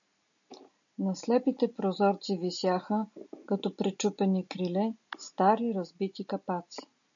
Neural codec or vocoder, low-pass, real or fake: none; 7.2 kHz; real